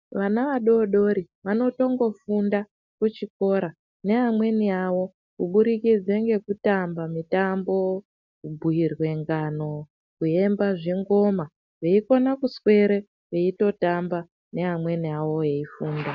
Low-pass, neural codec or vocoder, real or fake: 7.2 kHz; none; real